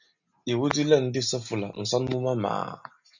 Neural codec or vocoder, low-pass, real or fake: none; 7.2 kHz; real